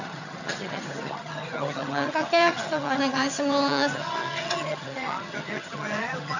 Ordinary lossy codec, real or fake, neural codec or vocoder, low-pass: none; fake; vocoder, 22.05 kHz, 80 mel bands, HiFi-GAN; 7.2 kHz